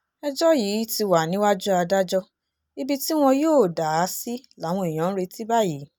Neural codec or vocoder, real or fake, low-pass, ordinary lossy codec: none; real; none; none